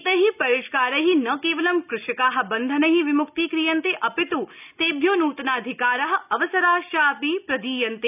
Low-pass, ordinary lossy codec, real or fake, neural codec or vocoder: 3.6 kHz; none; real; none